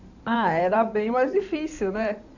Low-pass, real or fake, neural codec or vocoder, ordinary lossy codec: 7.2 kHz; fake; codec, 16 kHz in and 24 kHz out, 2.2 kbps, FireRedTTS-2 codec; none